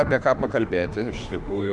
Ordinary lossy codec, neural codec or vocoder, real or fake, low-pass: Opus, 64 kbps; autoencoder, 48 kHz, 32 numbers a frame, DAC-VAE, trained on Japanese speech; fake; 10.8 kHz